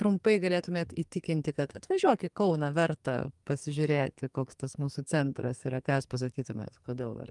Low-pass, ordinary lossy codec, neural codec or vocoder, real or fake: 10.8 kHz; Opus, 32 kbps; codec, 44.1 kHz, 2.6 kbps, SNAC; fake